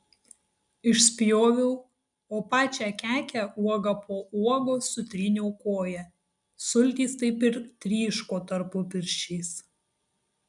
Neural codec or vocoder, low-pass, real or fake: none; 10.8 kHz; real